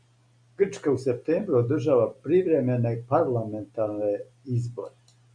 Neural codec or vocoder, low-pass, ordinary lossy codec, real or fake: none; 9.9 kHz; MP3, 96 kbps; real